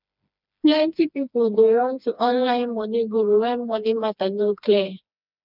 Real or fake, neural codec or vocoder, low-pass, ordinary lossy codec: fake; codec, 16 kHz, 2 kbps, FreqCodec, smaller model; 5.4 kHz; none